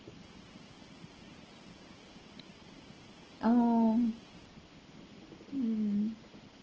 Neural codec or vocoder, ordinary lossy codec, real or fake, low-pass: none; Opus, 16 kbps; real; 7.2 kHz